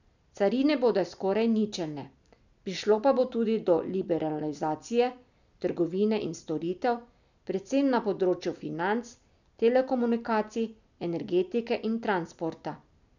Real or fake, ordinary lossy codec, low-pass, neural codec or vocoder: real; none; 7.2 kHz; none